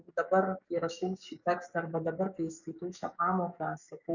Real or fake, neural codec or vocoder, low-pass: fake; codec, 44.1 kHz, 7.8 kbps, DAC; 7.2 kHz